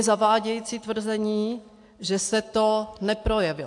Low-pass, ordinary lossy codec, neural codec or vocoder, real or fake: 10.8 kHz; AAC, 64 kbps; none; real